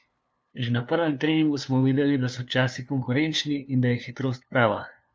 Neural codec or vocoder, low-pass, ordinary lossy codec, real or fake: codec, 16 kHz, 2 kbps, FunCodec, trained on LibriTTS, 25 frames a second; none; none; fake